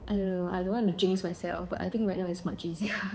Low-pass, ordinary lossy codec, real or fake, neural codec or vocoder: none; none; fake; codec, 16 kHz, 4 kbps, X-Codec, HuBERT features, trained on balanced general audio